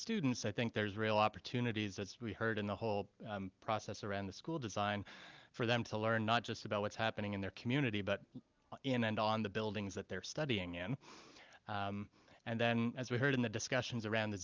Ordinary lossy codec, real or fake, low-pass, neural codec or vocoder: Opus, 32 kbps; real; 7.2 kHz; none